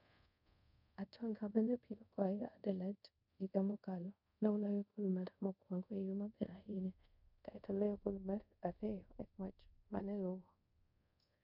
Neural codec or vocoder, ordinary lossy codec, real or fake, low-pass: codec, 24 kHz, 0.5 kbps, DualCodec; none; fake; 5.4 kHz